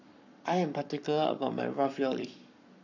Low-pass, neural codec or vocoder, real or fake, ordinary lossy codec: 7.2 kHz; codec, 44.1 kHz, 7.8 kbps, Pupu-Codec; fake; none